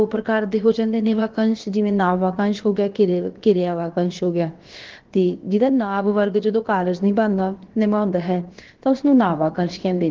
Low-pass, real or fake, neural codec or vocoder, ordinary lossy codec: 7.2 kHz; fake; codec, 16 kHz, about 1 kbps, DyCAST, with the encoder's durations; Opus, 16 kbps